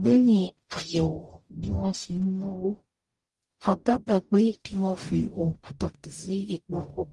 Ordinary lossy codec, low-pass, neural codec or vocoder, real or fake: Opus, 24 kbps; 10.8 kHz; codec, 44.1 kHz, 0.9 kbps, DAC; fake